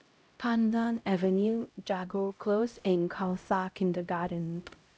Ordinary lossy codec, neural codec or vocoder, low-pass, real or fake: none; codec, 16 kHz, 0.5 kbps, X-Codec, HuBERT features, trained on LibriSpeech; none; fake